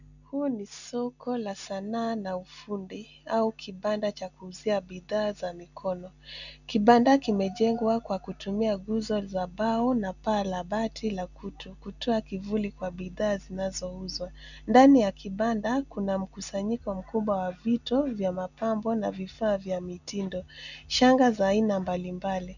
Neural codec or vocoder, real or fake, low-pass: none; real; 7.2 kHz